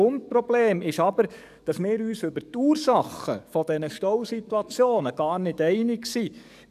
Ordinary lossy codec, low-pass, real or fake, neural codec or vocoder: none; 14.4 kHz; fake; codec, 44.1 kHz, 7.8 kbps, DAC